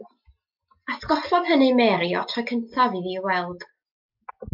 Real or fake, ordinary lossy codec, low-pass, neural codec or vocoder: real; MP3, 48 kbps; 5.4 kHz; none